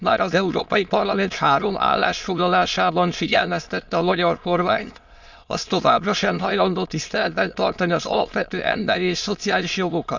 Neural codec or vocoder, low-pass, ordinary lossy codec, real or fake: autoencoder, 22.05 kHz, a latent of 192 numbers a frame, VITS, trained on many speakers; 7.2 kHz; Opus, 64 kbps; fake